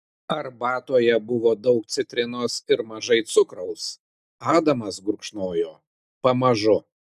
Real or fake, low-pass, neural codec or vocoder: real; 14.4 kHz; none